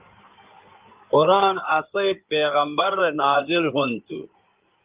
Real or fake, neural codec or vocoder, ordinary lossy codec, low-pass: fake; codec, 16 kHz in and 24 kHz out, 2.2 kbps, FireRedTTS-2 codec; Opus, 32 kbps; 3.6 kHz